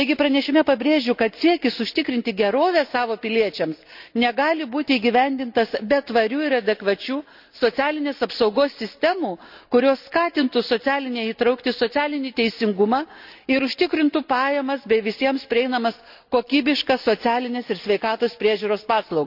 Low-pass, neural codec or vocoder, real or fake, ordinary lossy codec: 5.4 kHz; none; real; none